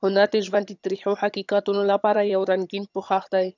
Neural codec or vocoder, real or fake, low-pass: vocoder, 22.05 kHz, 80 mel bands, HiFi-GAN; fake; 7.2 kHz